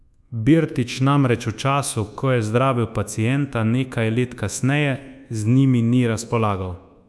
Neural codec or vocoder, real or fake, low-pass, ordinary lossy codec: codec, 24 kHz, 1.2 kbps, DualCodec; fake; none; none